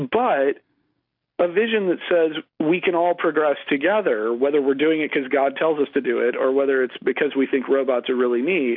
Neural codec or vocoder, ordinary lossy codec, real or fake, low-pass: none; AAC, 48 kbps; real; 5.4 kHz